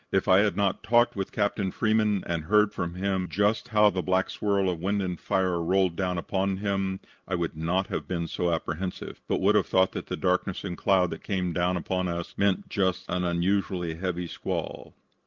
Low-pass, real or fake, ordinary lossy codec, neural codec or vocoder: 7.2 kHz; real; Opus, 32 kbps; none